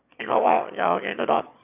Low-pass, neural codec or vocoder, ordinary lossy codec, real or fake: 3.6 kHz; autoencoder, 22.05 kHz, a latent of 192 numbers a frame, VITS, trained on one speaker; none; fake